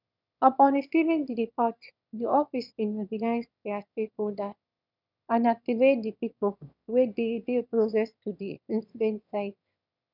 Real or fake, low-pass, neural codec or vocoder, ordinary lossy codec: fake; 5.4 kHz; autoencoder, 22.05 kHz, a latent of 192 numbers a frame, VITS, trained on one speaker; none